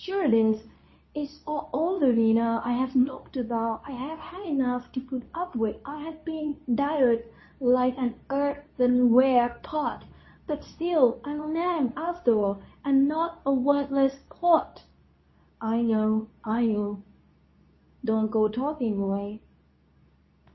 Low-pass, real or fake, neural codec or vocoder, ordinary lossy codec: 7.2 kHz; fake; codec, 24 kHz, 0.9 kbps, WavTokenizer, medium speech release version 2; MP3, 24 kbps